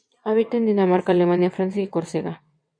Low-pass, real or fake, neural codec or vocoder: 9.9 kHz; fake; vocoder, 22.05 kHz, 80 mel bands, WaveNeXt